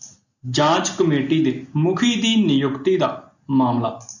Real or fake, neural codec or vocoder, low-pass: real; none; 7.2 kHz